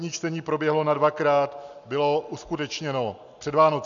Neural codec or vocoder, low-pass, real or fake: none; 7.2 kHz; real